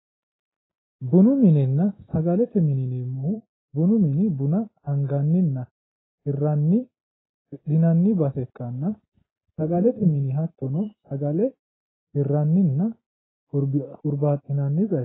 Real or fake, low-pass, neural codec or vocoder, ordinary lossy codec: real; 7.2 kHz; none; AAC, 16 kbps